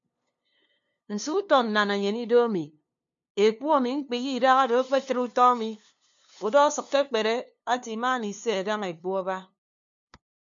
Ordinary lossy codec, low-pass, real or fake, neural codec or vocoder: MP3, 64 kbps; 7.2 kHz; fake; codec, 16 kHz, 2 kbps, FunCodec, trained on LibriTTS, 25 frames a second